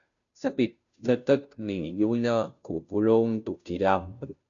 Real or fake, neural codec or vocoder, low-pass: fake; codec, 16 kHz, 0.5 kbps, FunCodec, trained on Chinese and English, 25 frames a second; 7.2 kHz